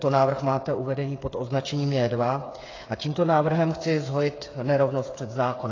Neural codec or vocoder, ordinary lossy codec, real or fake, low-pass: codec, 16 kHz, 8 kbps, FreqCodec, smaller model; AAC, 32 kbps; fake; 7.2 kHz